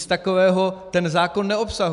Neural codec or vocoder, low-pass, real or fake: none; 10.8 kHz; real